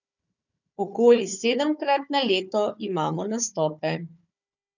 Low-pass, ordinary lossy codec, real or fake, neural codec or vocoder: 7.2 kHz; none; fake; codec, 16 kHz, 4 kbps, FunCodec, trained on Chinese and English, 50 frames a second